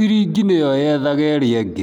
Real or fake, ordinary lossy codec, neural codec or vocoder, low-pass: real; none; none; 19.8 kHz